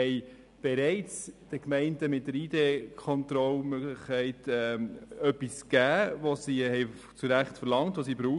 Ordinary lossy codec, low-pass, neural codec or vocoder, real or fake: none; 10.8 kHz; none; real